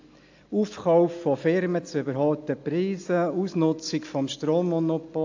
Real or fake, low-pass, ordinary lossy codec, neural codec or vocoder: real; 7.2 kHz; AAC, 48 kbps; none